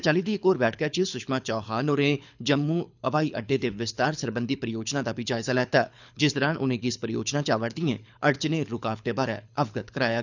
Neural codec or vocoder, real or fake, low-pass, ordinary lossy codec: codec, 24 kHz, 6 kbps, HILCodec; fake; 7.2 kHz; none